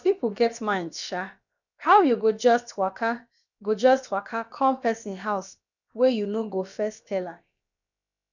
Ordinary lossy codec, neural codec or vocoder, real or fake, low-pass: none; codec, 16 kHz, about 1 kbps, DyCAST, with the encoder's durations; fake; 7.2 kHz